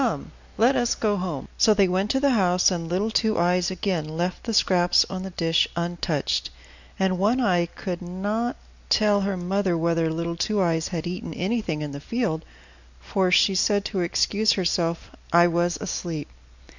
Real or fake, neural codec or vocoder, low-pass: real; none; 7.2 kHz